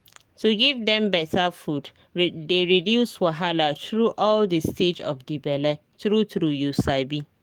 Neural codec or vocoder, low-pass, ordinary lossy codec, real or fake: codec, 44.1 kHz, 7.8 kbps, DAC; 19.8 kHz; Opus, 32 kbps; fake